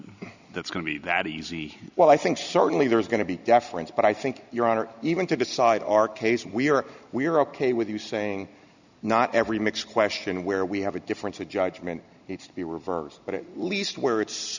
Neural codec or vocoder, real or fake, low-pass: none; real; 7.2 kHz